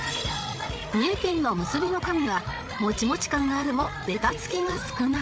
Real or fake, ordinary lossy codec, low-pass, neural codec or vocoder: fake; none; none; codec, 16 kHz, 8 kbps, FreqCodec, larger model